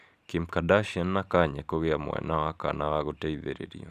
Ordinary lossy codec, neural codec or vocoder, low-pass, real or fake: none; vocoder, 44.1 kHz, 128 mel bands every 512 samples, BigVGAN v2; 14.4 kHz; fake